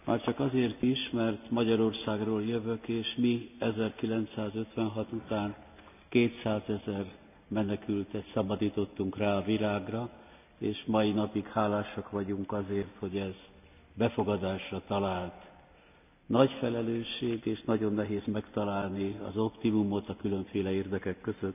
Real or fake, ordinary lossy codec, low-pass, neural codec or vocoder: real; none; 3.6 kHz; none